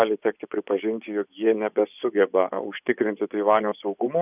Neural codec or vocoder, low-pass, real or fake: vocoder, 24 kHz, 100 mel bands, Vocos; 3.6 kHz; fake